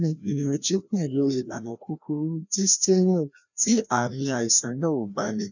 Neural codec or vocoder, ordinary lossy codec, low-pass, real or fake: codec, 16 kHz, 1 kbps, FreqCodec, larger model; none; 7.2 kHz; fake